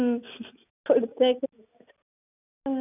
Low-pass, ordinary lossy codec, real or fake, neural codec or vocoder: 3.6 kHz; none; real; none